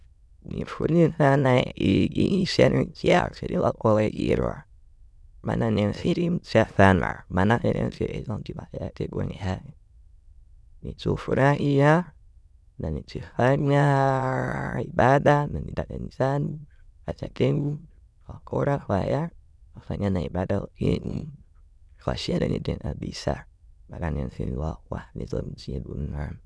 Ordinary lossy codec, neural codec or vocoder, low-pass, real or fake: none; autoencoder, 22.05 kHz, a latent of 192 numbers a frame, VITS, trained on many speakers; none; fake